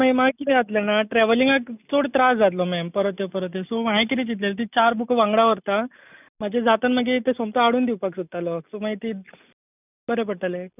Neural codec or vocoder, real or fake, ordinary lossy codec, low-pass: none; real; none; 3.6 kHz